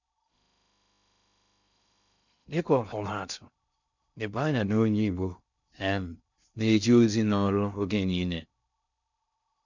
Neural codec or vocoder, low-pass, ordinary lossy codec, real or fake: codec, 16 kHz in and 24 kHz out, 0.6 kbps, FocalCodec, streaming, 2048 codes; 7.2 kHz; none; fake